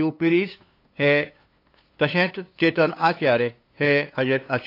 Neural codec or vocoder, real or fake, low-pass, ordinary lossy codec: codec, 16 kHz, 2 kbps, FunCodec, trained on LibriTTS, 25 frames a second; fake; 5.4 kHz; AAC, 32 kbps